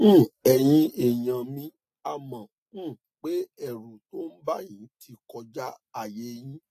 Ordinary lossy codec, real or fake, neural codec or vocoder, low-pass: AAC, 64 kbps; real; none; 14.4 kHz